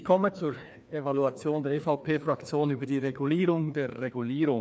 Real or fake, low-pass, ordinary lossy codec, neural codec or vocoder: fake; none; none; codec, 16 kHz, 2 kbps, FreqCodec, larger model